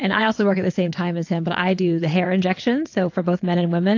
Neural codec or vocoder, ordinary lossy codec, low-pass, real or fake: vocoder, 22.05 kHz, 80 mel bands, WaveNeXt; AAC, 48 kbps; 7.2 kHz; fake